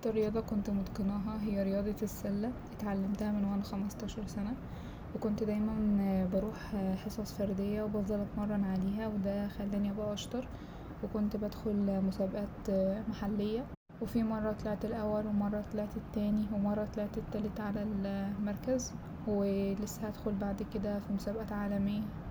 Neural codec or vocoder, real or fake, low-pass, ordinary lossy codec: none; real; none; none